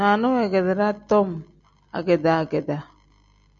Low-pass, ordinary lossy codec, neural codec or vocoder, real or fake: 7.2 kHz; MP3, 48 kbps; none; real